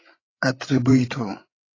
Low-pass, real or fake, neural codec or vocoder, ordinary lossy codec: 7.2 kHz; fake; codec, 16 kHz, 8 kbps, FreqCodec, larger model; AAC, 32 kbps